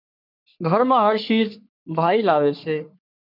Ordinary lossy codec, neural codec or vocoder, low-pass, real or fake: MP3, 48 kbps; codec, 44.1 kHz, 3.4 kbps, Pupu-Codec; 5.4 kHz; fake